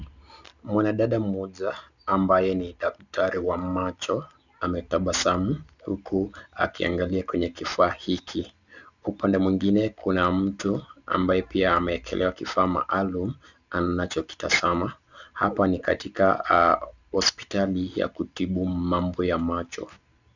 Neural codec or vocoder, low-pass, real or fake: none; 7.2 kHz; real